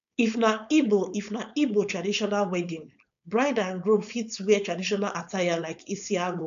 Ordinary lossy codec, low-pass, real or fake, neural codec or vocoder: none; 7.2 kHz; fake; codec, 16 kHz, 4.8 kbps, FACodec